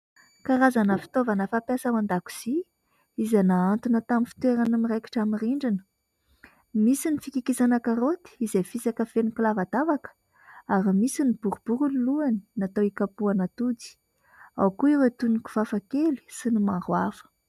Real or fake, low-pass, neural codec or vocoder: real; 14.4 kHz; none